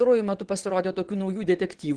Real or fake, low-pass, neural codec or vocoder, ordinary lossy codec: fake; 9.9 kHz; vocoder, 22.05 kHz, 80 mel bands, WaveNeXt; Opus, 16 kbps